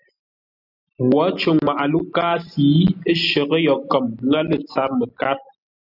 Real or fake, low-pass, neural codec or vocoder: real; 5.4 kHz; none